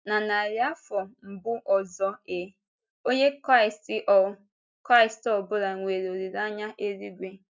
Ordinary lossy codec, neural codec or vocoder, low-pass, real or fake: none; none; none; real